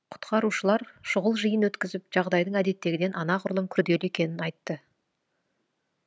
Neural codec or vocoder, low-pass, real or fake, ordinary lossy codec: none; none; real; none